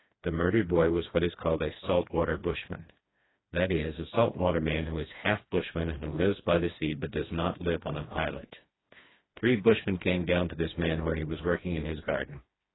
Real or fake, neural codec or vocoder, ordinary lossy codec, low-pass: fake; codec, 16 kHz, 2 kbps, FreqCodec, smaller model; AAC, 16 kbps; 7.2 kHz